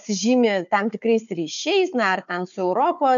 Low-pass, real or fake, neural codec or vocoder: 7.2 kHz; fake; codec, 16 kHz, 4 kbps, FunCodec, trained on Chinese and English, 50 frames a second